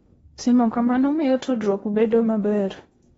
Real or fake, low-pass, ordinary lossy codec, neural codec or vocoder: fake; 10.8 kHz; AAC, 24 kbps; codec, 16 kHz in and 24 kHz out, 0.8 kbps, FocalCodec, streaming, 65536 codes